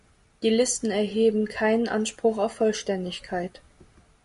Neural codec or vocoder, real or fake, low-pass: none; real; 10.8 kHz